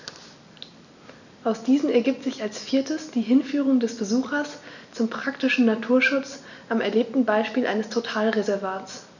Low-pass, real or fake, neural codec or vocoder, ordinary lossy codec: 7.2 kHz; real; none; none